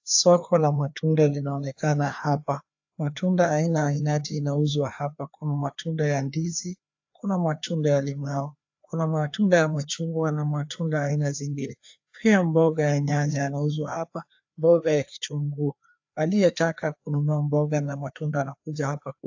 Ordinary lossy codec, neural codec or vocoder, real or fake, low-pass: AAC, 48 kbps; codec, 16 kHz, 2 kbps, FreqCodec, larger model; fake; 7.2 kHz